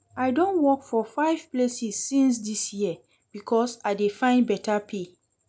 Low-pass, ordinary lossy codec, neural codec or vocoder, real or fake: none; none; none; real